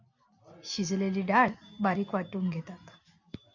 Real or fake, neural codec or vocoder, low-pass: real; none; 7.2 kHz